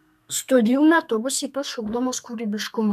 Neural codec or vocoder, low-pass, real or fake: codec, 32 kHz, 1.9 kbps, SNAC; 14.4 kHz; fake